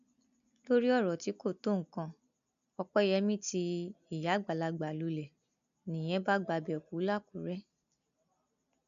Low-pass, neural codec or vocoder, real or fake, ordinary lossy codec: 7.2 kHz; none; real; none